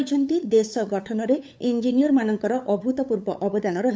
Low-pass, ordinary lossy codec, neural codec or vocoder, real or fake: none; none; codec, 16 kHz, 8 kbps, FunCodec, trained on LibriTTS, 25 frames a second; fake